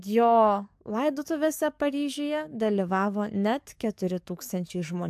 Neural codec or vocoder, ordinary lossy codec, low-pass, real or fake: codec, 44.1 kHz, 7.8 kbps, DAC; AAC, 96 kbps; 14.4 kHz; fake